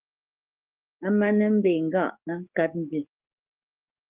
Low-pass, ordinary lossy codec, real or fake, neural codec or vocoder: 3.6 kHz; Opus, 32 kbps; fake; codec, 44.1 kHz, 7.8 kbps, DAC